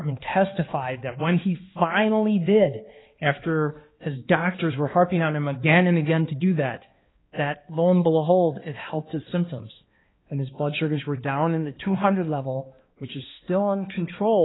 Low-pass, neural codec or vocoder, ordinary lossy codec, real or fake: 7.2 kHz; codec, 16 kHz, 2 kbps, X-Codec, HuBERT features, trained on balanced general audio; AAC, 16 kbps; fake